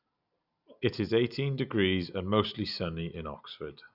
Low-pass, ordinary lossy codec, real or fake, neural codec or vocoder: 5.4 kHz; none; real; none